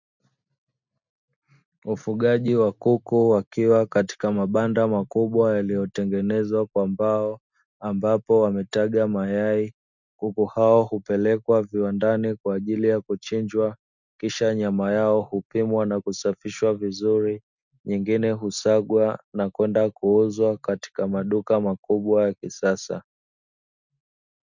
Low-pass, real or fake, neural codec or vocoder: 7.2 kHz; real; none